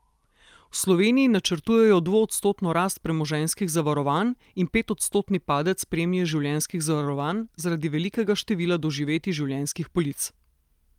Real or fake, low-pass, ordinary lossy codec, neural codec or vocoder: real; 19.8 kHz; Opus, 32 kbps; none